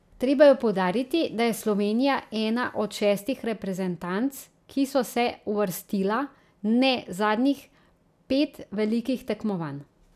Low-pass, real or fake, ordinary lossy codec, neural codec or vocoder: 14.4 kHz; real; none; none